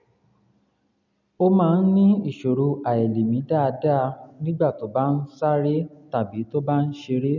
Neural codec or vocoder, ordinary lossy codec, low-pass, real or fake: none; none; 7.2 kHz; real